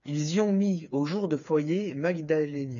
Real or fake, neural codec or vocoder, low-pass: fake; codec, 16 kHz, 4 kbps, FreqCodec, smaller model; 7.2 kHz